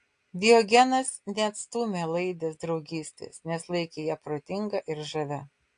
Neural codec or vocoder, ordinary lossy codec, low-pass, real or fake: none; AAC, 48 kbps; 9.9 kHz; real